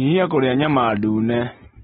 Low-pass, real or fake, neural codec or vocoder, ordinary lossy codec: 7.2 kHz; real; none; AAC, 16 kbps